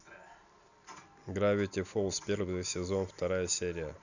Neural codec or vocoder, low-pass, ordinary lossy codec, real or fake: none; 7.2 kHz; none; real